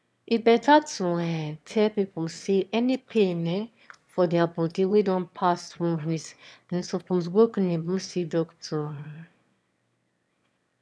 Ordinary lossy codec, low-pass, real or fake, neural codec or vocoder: none; none; fake; autoencoder, 22.05 kHz, a latent of 192 numbers a frame, VITS, trained on one speaker